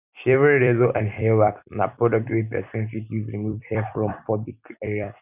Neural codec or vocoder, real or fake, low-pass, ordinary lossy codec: vocoder, 44.1 kHz, 128 mel bands every 256 samples, BigVGAN v2; fake; 3.6 kHz; none